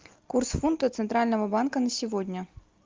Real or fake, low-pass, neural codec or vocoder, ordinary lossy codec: real; 7.2 kHz; none; Opus, 32 kbps